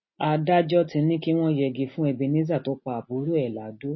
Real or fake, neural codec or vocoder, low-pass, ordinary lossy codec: real; none; 7.2 kHz; MP3, 24 kbps